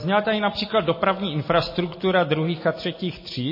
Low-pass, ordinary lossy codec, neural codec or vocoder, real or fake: 5.4 kHz; MP3, 24 kbps; none; real